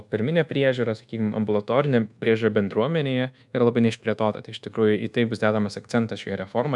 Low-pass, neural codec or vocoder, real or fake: 10.8 kHz; codec, 24 kHz, 1.2 kbps, DualCodec; fake